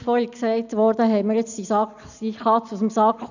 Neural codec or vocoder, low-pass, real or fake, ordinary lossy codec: none; 7.2 kHz; real; none